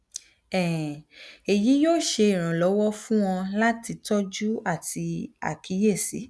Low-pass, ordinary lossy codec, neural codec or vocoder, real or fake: none; none; none; real